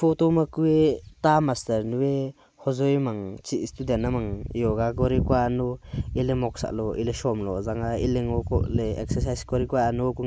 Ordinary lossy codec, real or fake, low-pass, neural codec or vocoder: none; real; none; none